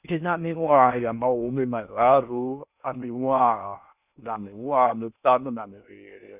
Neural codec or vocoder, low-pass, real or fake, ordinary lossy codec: codec, 16 kHz in and 24 kHz out, 0.6 kbps, FocalCodec, streaming, 2048 codes; 3.6 kHz; fake; none